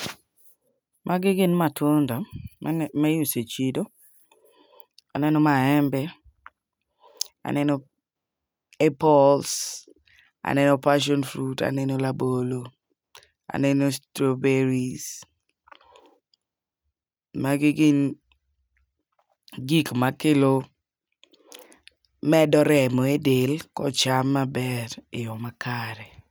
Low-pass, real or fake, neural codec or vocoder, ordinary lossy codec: none; real; none; none